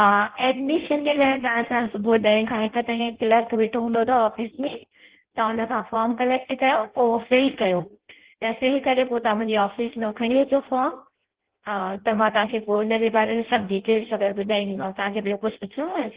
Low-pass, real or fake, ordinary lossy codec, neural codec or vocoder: 3.6 kHz; fake; Opus, 16 kbps; codec, 16 kHz in and 24 kHz out, 0.6 kbps, FireRedTTS-2 codec